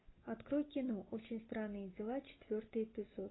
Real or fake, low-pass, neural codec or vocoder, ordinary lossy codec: real; 7.2 kHz; none; AAC, 16 kbps